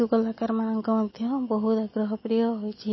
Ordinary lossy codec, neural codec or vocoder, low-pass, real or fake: MP3, 24 kbps; none; 7.2 kHz; real